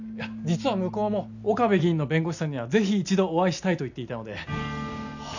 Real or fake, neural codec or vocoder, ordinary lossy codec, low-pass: real; none; none; 7.2 kHz